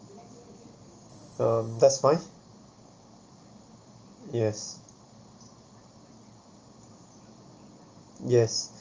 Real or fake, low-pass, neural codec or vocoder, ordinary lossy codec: real; 7.2 kHz; none; Opus, 24 kbps